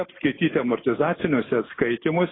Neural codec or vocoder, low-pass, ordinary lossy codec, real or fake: none; 7.2 kHz; AAC, 16 kbps; real